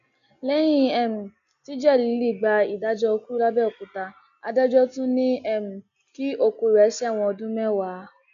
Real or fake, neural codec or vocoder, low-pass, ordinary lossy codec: real; none; 7.2 kHz; none